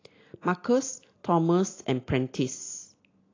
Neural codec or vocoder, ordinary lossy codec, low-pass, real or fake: none; AAC, 32 kbps; 7.2 kHz; real